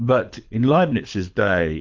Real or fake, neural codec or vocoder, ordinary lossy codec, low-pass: fake; codec, 24 kHz, 6 kbps, HILCodec; MP3, 64 kbps; 7.2 kHz